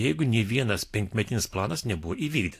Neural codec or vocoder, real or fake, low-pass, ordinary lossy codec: none; real; 14.4 kHz; AAC, 64 kbps